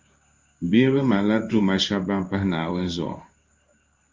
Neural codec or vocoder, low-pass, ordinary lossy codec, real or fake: codec, 16 kHz in and 24 kHz out, 1 kbps, XY-Tokenizer; 7.2 kHz; Opus, 32 kbps; fake